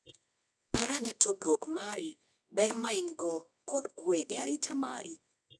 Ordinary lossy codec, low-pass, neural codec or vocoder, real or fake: none; none; codec, 24 kHz, 0.9 kbps, WavTokenizer, medium music audio release; fake